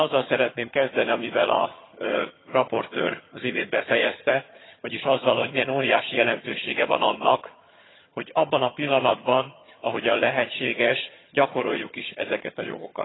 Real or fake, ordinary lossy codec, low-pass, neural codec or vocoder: fake; AAC, 16 kbps; 7.2 kHz; vocoder, 22.05 kHz, 80 mel bands, HiFi-GAN